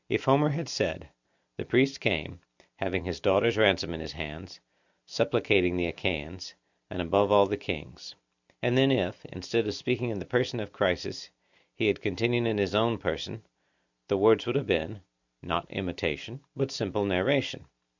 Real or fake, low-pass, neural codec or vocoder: real; 7.2 kHz; none